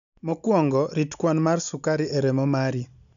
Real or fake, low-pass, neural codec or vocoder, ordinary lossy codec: real; 7.2 kHz; none; none